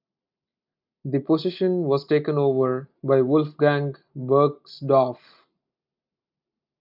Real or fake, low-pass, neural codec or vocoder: real; 5.4 kHz; none